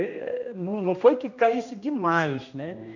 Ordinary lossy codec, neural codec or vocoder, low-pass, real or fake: none; codec, 16 kHz, 1 kbps, X-Codec, HuBERT features, trained on general audio; 7.2 kHz; fake